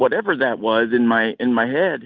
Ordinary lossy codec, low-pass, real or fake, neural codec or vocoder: Opus, 64 kbps; 7.2 kHz; real; none